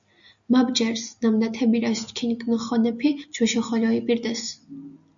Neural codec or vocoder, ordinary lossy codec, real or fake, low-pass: none; MP3, 64 kbps; real; 7.2 kHz